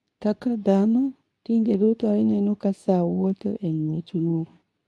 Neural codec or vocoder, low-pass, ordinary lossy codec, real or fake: codec, 24 kHz, 0.9 kbps, WavTokenizer, medium speech release version 2; none; none; fake